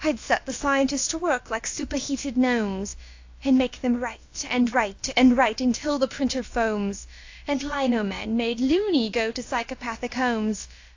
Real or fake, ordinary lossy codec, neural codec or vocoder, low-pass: fake; AAC, 48 kbps; codec, 16 kHz, about 1 kbps, DyCAST, with the encoder's durations; 7.2 kHz